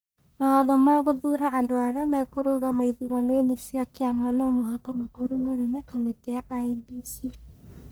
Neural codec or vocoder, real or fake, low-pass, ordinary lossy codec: codec, 44.1 kHz, 1.7 kbps, Pupu-Codec; fake; none; none